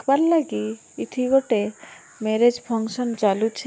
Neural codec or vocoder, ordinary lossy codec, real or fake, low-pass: none; none; real; none